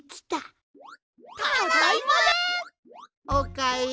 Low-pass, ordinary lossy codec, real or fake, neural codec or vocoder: none; none; real; none